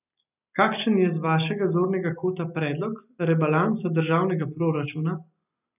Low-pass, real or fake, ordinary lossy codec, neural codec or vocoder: 3.6 kHz; real; none; none